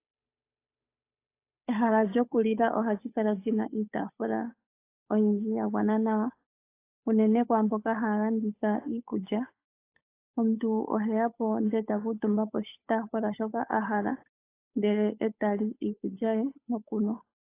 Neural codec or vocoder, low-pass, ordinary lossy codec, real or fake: codec, 16 kHz, 8 kbps, FunCodec, trained on Chinese and English, 25 frames a second; 3.6 kHz; AAC, 24 kbps; fake